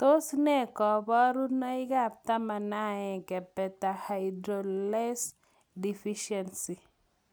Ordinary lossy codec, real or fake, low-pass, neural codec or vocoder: none; real; none; none